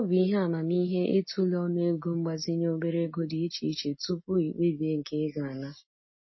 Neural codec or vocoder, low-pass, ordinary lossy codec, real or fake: none; 7.2 kHz; MP3, 24 kbps; real